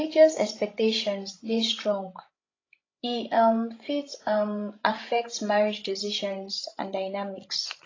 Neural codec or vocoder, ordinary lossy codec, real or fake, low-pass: codec, 16 kHz, 16 kbps, FreqCodec, larger model; AAC, 32 kbps; fake; 7.2 kHz